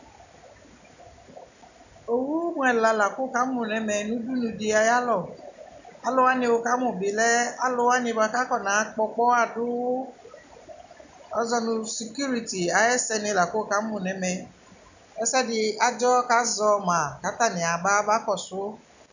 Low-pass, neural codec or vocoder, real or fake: 7.2 kHz; none; real